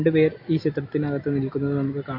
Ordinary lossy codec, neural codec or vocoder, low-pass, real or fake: MP3, 32 kbps; none; 5.4 kHz; real